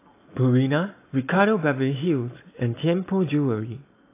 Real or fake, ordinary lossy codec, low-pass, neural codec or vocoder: real; AAC, 24 kbps; 3.6 kHz; none